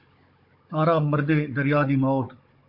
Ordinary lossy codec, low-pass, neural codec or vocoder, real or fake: MP3, 32 kbps; 5.4 kHz; codec, 16 kHz, 16 kbps, FunCodec, trained on Chinese and English, 50 frames a second; fake